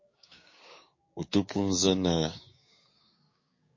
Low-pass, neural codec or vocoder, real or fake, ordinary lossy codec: 7.2 kHz; codec, 16 kHz, 6 kbps, DAC; fake; MP3, 32 kbps